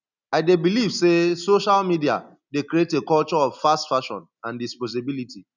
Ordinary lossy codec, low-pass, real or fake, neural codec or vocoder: none; 7.2 kHz; real; none